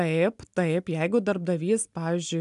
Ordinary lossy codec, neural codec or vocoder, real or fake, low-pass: AAC, 96 kbps; none; real; 10.8 kHz